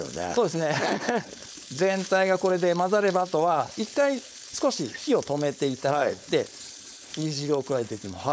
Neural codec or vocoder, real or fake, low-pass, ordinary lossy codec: codec, 16 kHz, 4.8 kbps, FACodec; fake; none; none